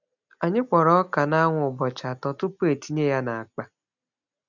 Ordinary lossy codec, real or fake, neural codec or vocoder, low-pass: none; real; none; 7.2 kHz